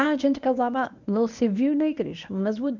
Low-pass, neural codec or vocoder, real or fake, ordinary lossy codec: 7.2 kHz; codec, 24 kHz, 0.9 kbps, WavTokenizer, medium speech release version 1; fake; none